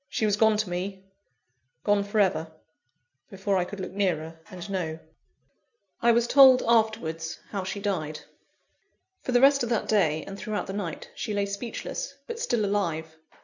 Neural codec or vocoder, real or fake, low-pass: none; real; 7.2 kHz